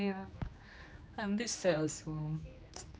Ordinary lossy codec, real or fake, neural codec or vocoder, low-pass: none; fake; codec, 16 kHz, 2 kbps, X-Codec, HuBERT features, trained on general audio; none